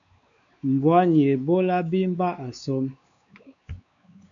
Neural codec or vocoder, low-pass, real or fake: codec, 16 kHz, 4 kbps, X-Codec, WavLM features, trained on Multilingual LibriSpeech; 7.2 kHz; fake